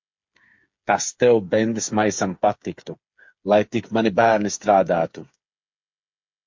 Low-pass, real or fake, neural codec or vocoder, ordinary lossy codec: 7.2 kHz; fake; codec, 16 kHz, 8 kbps, FreqCodec, smaller model; MP3, 48 kbps